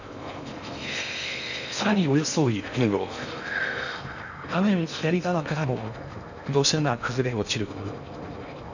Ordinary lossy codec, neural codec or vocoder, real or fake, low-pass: none; codec, 16 kHz in and 24 kHz out, 0.6 kbps, FocalCodec, streaming, 4096 codes; fake; 7.2 kHz